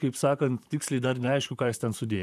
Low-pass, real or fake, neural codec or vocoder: 14.4 kHz; fake; vocoder, 44.1 kHz, 128 mel bands, Pupu-Vocoder